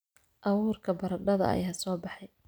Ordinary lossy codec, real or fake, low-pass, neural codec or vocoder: none; real; none; none